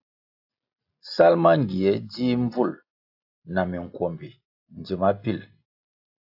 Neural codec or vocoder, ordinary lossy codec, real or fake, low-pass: none; AAC, 48 kbps; real; 5.4 kHz